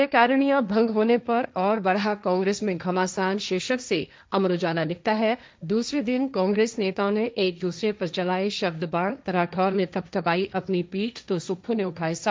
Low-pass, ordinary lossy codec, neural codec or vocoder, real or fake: none; none; codec, 16 kHz, 1.1 kbps, Voila-Tokenizer; fake